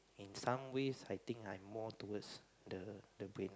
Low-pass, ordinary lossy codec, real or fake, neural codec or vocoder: none; none; real; none